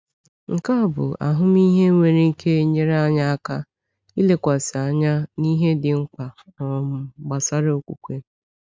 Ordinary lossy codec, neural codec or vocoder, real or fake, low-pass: none; none; real; none